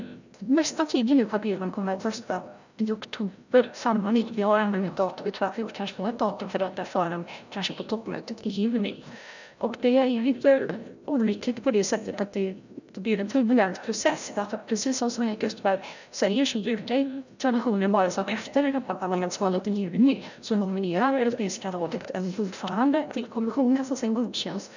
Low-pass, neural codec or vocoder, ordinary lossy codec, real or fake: 7.2 kHz; codec, 16 kHz, 0.5 kbps, FreqCodec, larger model; none; fake